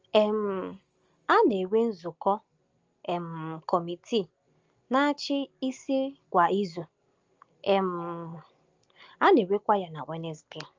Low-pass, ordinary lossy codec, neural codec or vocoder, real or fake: 7.2 kHz; Opus, 32 kbps; none; real